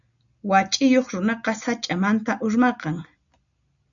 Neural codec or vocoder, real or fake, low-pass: none; real; 7.2 kHz